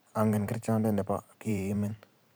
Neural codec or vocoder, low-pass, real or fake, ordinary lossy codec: vocoder, 44.1 kHz, 128 mel bands every 512 samples, BigVGAN v2; none; fake; none